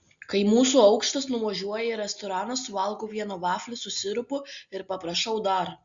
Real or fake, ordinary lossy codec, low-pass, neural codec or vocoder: real; Opus, 64 kbps; 7.2 kHz; none